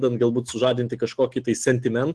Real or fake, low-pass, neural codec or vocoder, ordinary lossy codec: real; 10.8 kHz; none; Opus, 16 kbps